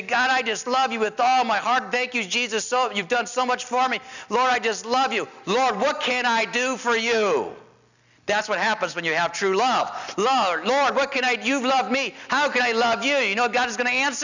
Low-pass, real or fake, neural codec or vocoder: 7.2 kHz; real; none